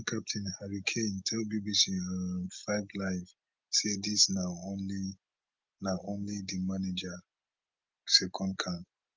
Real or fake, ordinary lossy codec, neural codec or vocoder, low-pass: real; Opus, 24 kbps; none; 7.2 kHz